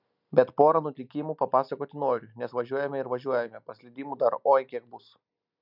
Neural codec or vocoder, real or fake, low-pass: none; real; 5.4 kHz